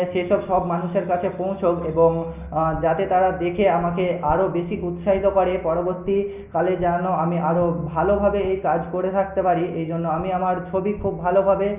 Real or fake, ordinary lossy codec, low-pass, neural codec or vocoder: real; none; 3.6 kHz; none